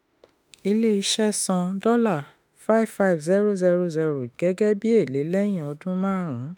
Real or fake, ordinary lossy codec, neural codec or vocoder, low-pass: fake; none; autoencoder, 48 kHz, 32 numbers a frame, DAC-VAE, trained on Japanese speech; none